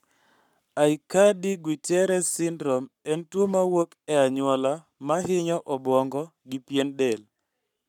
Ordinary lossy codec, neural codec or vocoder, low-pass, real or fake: none; codec, 44.1 kHz, 7.8 kbps, Pupu-Codec; 19.8 kHz; fake